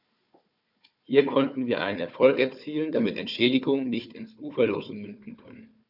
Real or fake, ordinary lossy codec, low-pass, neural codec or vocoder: fake; none; 5.4 kHz; codec, 16 kHz, 4 kbps, FunCodec, trained on Chinese and English, 50 frames a second